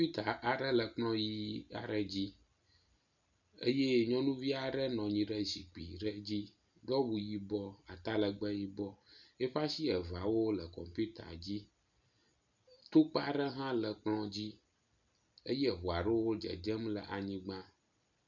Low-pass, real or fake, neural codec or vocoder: 7.2 kHz; real; none